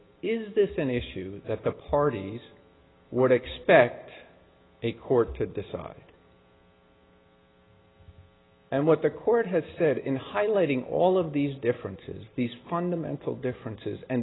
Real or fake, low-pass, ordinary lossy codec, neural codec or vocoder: real; 7.2 kHz; AAC, 16 kbps; none